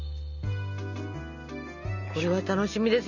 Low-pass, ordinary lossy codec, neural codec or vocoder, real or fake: 7.2 kHz; none; none; real